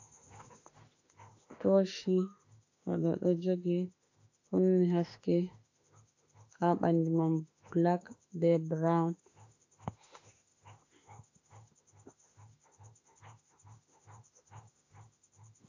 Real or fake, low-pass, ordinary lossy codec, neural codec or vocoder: fake; 7.2 kHz; AAC, 48 kbps; autoencoder, 48 kHz, 32 numbers a frame, DAC-VAE, trained on Japanese speech